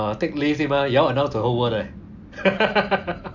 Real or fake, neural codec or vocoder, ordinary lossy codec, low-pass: real; none; none; 7.2 kHz